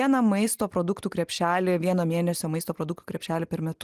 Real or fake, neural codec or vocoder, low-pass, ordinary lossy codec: real; none; 14.4 kHz; Opus, 24 kbps